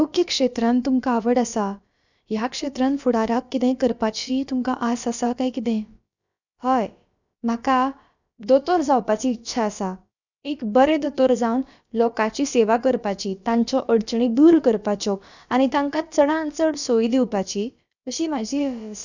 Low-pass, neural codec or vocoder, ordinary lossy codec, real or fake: 7.2 kHz; codec, 16 kHz, about 1 kbps, DyCAST, with the encoder's durations; none; fake